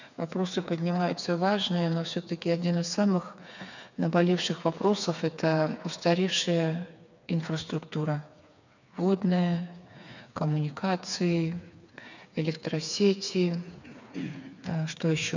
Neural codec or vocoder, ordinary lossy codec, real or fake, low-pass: codec, 16 kHz, 4 kbps, FreqCodec, smaller model; none; fake; 7.2 kHz